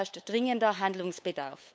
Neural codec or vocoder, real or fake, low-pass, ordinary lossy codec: codec, 16 kHz, 8 kbps, FunCodec, trained on LibriTTS, 25 frames a second; fake; none; none